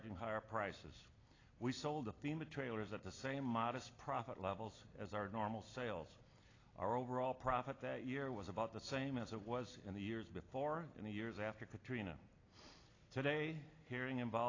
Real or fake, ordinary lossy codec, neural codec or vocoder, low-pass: real; AAC, 32 kbps; none; 7.2 kHz